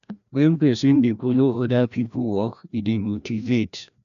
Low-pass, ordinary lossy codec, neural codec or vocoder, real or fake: 7.2 kHz; none; codec, 16 kHz, 1 kbps, FreqCodec, larger model; fake